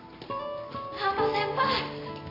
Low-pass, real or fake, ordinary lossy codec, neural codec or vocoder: 5.4 kHz; real; AAC, 24 kbps; none